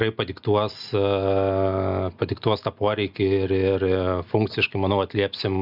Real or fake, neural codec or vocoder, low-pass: real; none; 5.4 kHz